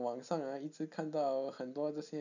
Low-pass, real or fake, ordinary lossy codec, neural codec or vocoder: 7.2 kHz; real; none; none